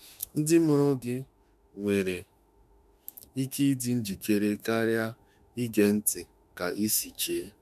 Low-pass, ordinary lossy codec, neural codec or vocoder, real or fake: 14.4 kHz; none; autoencoder, 48 kHz, 32 numbers a frame, DAC-VAE, trained on Japanese speech; fake